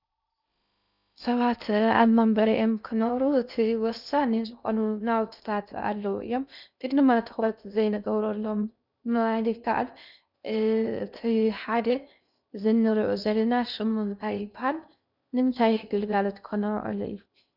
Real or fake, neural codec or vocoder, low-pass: fake; codec, 16 kHz in and 24 kHz out, 0.6 kbps, FocalCodec, streaming, 2048 codes; 5.4 kHz